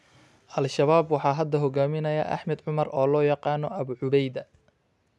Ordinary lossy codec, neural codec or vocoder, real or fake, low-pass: none; none; real; none